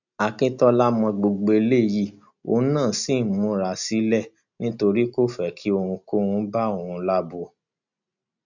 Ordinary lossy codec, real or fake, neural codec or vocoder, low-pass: none; real; none; 7.2 kHz